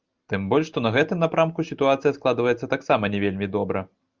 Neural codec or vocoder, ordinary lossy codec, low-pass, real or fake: none; Opus, 24 kbps; 7.2 kHz; real